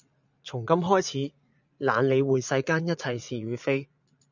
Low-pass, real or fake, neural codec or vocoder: 7.2 kHz; fake; vocoder, 44.1 kHz, 80 mel bands, Vocos